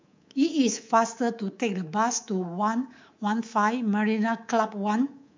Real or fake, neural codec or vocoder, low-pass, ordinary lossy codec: fake; codec, 24 kHz, 3.1 kbps, DualCodec; 7.2 kHz; MP3, 64 kbps